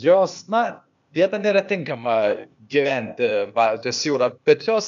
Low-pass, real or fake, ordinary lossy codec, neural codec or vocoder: 7.2 kHz; fake; MP3, 96 kbps; codec, 16 kHz, 0.8 kbps, ZipCodec